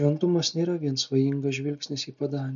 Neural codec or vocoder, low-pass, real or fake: none; 7.2 kHz; real